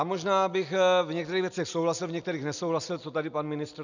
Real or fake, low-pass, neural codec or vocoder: real; 7.2 kHz; none